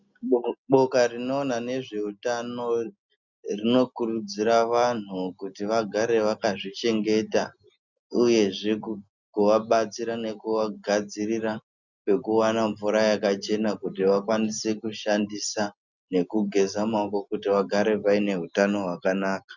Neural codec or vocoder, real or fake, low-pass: none; real; 7.2 kHz